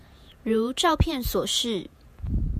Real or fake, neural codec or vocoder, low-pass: fake; vocoder, 48 kHz, 128 mel bands, Vocos; 14.4 kHz